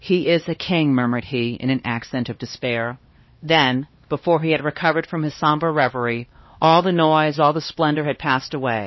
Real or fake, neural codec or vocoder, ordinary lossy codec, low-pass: fake; codec, 16 kHz, 2 kbps, X-Codec, HuBERT features, trained on LibriSpeech; MP3, 24 kbps; 7.2 kHz